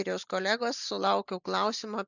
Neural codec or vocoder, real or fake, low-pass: none; real; 7.2 kHz